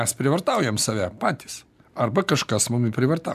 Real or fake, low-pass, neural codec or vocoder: real; 14.4 kHz; none